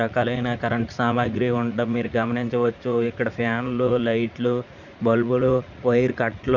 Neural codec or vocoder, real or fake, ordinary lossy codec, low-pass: vocoder, 44.1 kHz, 80 mel bands, Vocos; fake; none; 7.2 kHz